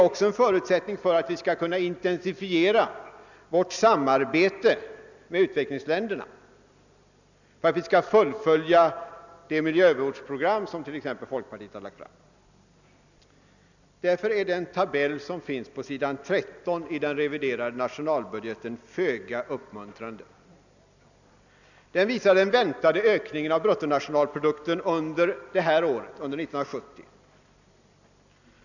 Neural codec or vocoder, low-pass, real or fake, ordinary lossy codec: none; 7.2 kHz; real; none